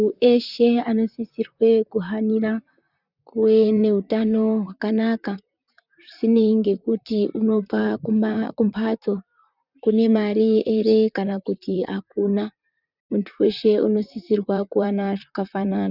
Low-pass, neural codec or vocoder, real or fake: 5.4 kHz; vocoder, 44.1 kHz, 128 mel bands, Pupu-Vocoder; fake